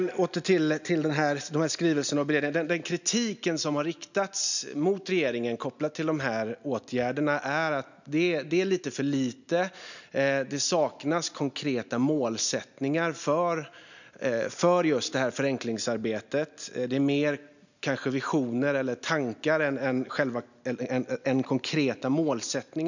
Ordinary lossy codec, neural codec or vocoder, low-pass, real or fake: none; none; 7.2 kHz; real